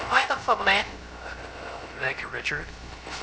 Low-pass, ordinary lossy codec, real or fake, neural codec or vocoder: none; none; fake; codec, 16 kHz, 0.3 kbps, FocalCodec